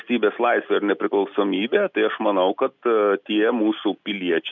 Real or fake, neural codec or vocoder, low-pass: real; none; 7.2 kHz